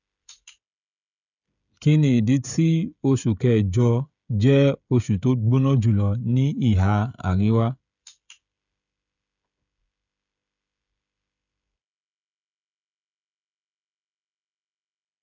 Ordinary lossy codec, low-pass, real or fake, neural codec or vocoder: none; 7.2 kHz; fake; codec, 16 kHz, 16 kbps, FreqCodec, smaller model